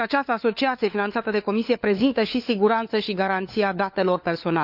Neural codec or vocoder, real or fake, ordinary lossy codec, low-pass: codec, 16 kHz, 4 kbps, FunCodec, trained on LibriTTS, 50 frames a second; fake; none; 5.4 kHz